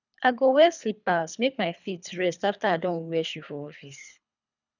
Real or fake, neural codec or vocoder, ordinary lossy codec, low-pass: fake; codec, 24 kHz, 3 kbps, HILCodec; none; 7.2 kHz